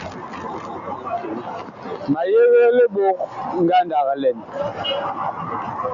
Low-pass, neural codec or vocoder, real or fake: 7.2 kHz; none; real